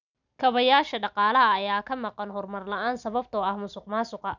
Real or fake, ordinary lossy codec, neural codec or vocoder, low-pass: real; none; none; 7.2 kHz